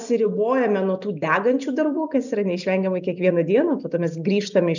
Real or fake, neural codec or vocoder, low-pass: real; none; 7.2 kHz